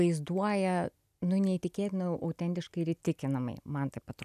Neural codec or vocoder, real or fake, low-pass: none; real; 14.4 kHz